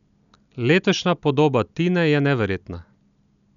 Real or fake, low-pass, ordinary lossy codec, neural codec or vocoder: real; 7.2 kHz; none; none